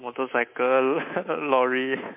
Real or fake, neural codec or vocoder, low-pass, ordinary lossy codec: fake; codec, 24 kHz, 3.1 kbps, DualCodec; 3.6 kHz; MP3, 24 kbps